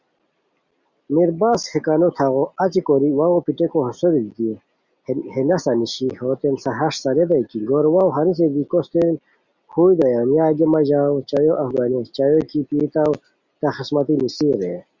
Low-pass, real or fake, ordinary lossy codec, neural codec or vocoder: 7.2 kHz; real; Opus, 64 kbps; none